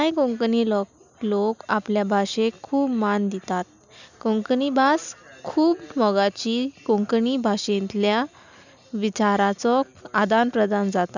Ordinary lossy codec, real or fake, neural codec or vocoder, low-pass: none; real; none; 7.2 kHz